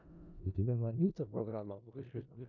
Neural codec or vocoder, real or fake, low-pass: codec, 16 kHz in and 24 kHz out, 0.4 kbps, LongCat-Audio-Codec, four codebook decoder; fake; 7.2 kHz